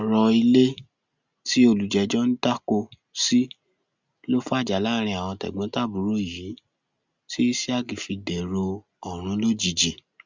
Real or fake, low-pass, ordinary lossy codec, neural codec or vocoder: real; 7.2 kHz; Opus, 64 kbps; none